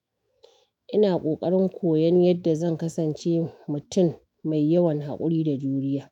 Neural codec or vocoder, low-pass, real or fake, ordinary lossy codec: autoencoder, 48 kHz, 128 numbers a frame, DAC-VAE, trained on Japanese speech; 19.8 kHz; fake; none